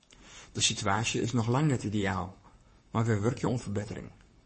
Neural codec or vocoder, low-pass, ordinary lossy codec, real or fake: codec, 44.1 kHz, 7.8 kbps, Pupu-Codec; 10.8 kHz; MP3, 32 kbps; fake